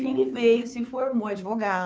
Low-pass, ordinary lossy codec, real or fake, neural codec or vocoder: none; none; fake; codec, 16 kHz, 2 kbps, FunCodec, trained on Chinese and English, 25 frames a second